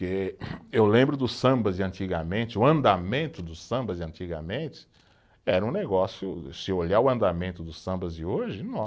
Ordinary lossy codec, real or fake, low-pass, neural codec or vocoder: none; real; none; none